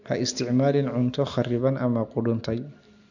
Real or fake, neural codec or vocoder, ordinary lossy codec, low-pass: real; none; none; 7.2 kHz